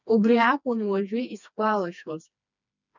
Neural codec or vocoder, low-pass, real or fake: codec, 16 kHz, 2 kbps, FreqCodec, smaller model; 7.2 kHz; fake